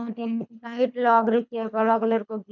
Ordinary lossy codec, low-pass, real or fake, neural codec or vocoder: none; 7.2 kHz; fake; codec, 24 kHz, 3 kbps, HILCodec